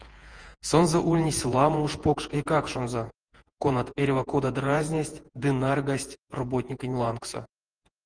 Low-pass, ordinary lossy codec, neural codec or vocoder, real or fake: 9.9 kHz; Opus, 24 kbps; vocoder, 48 kHz, 128 mel bands, Vocos; fake